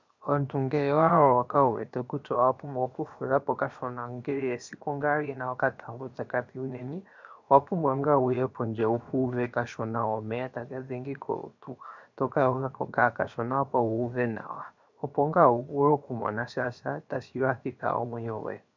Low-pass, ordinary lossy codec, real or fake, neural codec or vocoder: 7.2 kHz; MP3, 64 kbps; fake; codec, 16 kHz, 0.7 kbps, FocalCodec